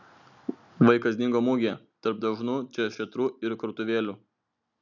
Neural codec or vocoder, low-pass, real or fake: none; 7.2 kHz; real